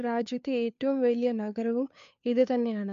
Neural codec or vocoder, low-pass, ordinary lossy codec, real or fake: codec, 16 kHz, 2 kbps, FunCodec, trained on Chinese and English, 25 frames a second; 7.2 kHz; MP3, 64 kbps; fake